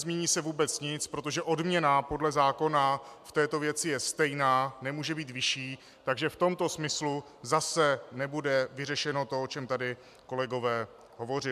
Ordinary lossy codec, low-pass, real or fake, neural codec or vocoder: MP3, 96 kbps; 14.4 kHz; real; none